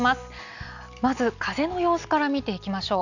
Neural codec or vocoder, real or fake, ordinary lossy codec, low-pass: none; real; none; 7.2 kHz